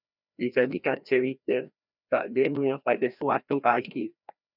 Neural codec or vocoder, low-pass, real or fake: codec, 16 kHz, 1 kbps, FreqCodec, larger model; 5.4 kHz; fake